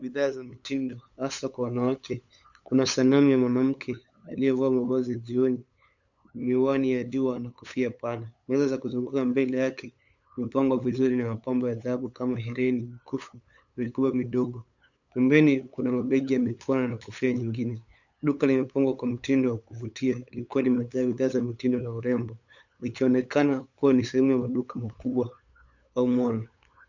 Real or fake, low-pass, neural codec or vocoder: fake; 7.2 kHz; codec, 16 kHz, 8 kbps, FunCodec, trained on LibriTTS, 25 frames a second